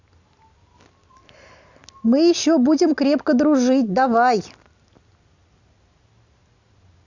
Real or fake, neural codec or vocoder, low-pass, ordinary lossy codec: real; none; 7.2 kHz; Opus, 64 kbps